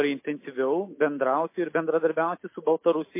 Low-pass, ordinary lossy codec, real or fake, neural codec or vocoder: 3.6 kHz; MP3, 24 kbps; real; none